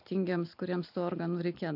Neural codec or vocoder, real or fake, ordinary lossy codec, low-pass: none; real; AAC, 48 kbps; 5.4 kHz